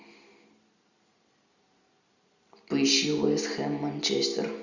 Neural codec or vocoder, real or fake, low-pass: none; real; 7.2 kHz